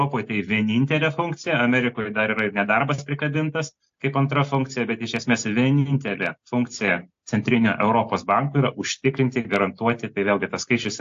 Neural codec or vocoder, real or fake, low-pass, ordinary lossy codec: none; real; 7.2 kHz; AAC, 48 kbps